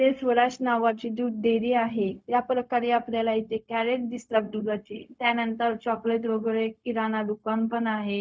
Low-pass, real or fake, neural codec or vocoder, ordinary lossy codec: none; fake; codec, 16 kHz, 0.4 kbps, LongCat-Audio-Codec; none